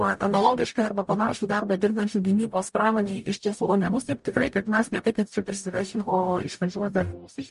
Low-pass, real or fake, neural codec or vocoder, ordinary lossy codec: 14.4 kHz; fake; codec, 44.1 kHz, 0.9 kbps, DAC; MP3, 48 kbps